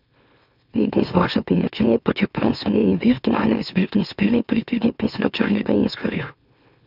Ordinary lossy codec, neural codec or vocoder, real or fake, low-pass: none; autoencoder, 44.1 kHz, a latent of 192 numbers a frame, MeloTTS; fake; 5.4 kHz